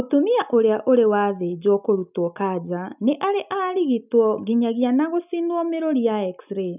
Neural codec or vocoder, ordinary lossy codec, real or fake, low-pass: none; none; real; 3.6 kHz